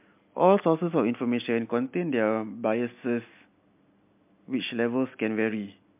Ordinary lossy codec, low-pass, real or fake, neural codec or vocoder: MP3, 32 kbps; 3.6 kHz; real; none